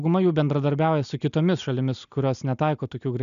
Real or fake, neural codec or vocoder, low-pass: real; none; 7.2 kHz